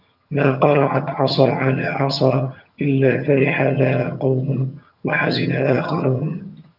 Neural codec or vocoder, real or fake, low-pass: vocoder, 22.05 kHz, 80 mel bands, HiFi-GAN; fake; 5.4 kHz